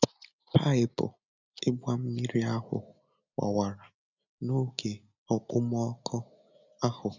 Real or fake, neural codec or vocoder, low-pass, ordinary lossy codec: real; none; 7.2 kHz; none